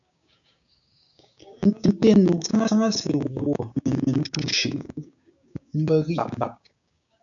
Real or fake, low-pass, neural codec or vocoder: fake; 7.2 kHz; codec, 16 kHz, 6 kbps, DAC